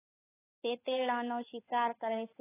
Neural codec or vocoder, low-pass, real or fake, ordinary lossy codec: codec, 16 kHz, 4 kbps, X-Codec, WavLM features, trained on Multilingual LibriSpeech; 3.6 kHz; fake; AAC, 16 kbps